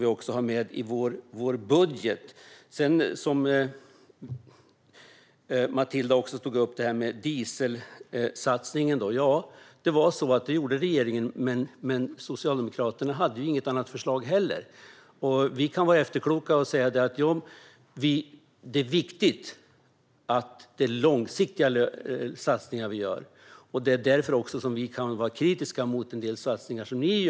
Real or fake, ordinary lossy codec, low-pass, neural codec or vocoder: real; none; none; none